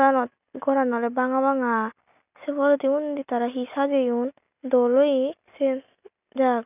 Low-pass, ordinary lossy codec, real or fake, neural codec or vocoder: 3.6 kHz; none; real; none